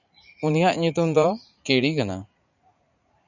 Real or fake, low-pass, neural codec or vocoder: fake; 7.2 kHz; vocoder, 44.1 kHz, 80 mel bands, Vocos